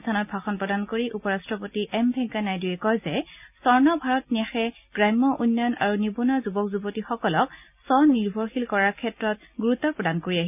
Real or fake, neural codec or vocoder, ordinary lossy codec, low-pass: real; none; none; 3.6 kHz